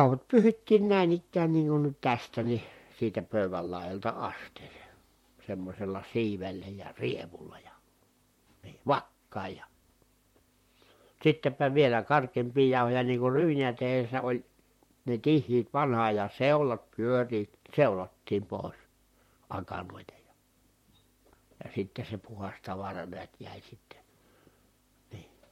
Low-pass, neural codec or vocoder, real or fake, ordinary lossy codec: 19.8 kHz; vocoder, 44.1 kHz, 128 mel bands, Pupu-Vocoder; fake; MP3, 64 kbps